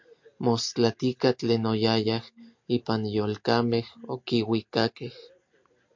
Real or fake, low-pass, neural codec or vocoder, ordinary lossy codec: real; 7.2 kHz; none; MP3, 48 kbps